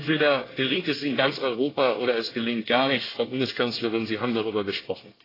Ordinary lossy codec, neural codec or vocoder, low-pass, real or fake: AAC, 24 kbps; codec, 24 kHz, 1 kbps, SNAC; 5.4 kHz; fake